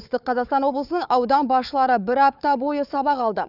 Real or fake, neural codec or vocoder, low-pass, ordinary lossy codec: fake; codec, 16 kHz, 4 kbps, FunCodec, trained on Chinese and English, 50 frames a second; 5.4 kHz; none